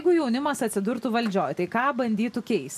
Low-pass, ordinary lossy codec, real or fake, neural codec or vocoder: 14.4 kHz; MP3, 96 kbps; real; none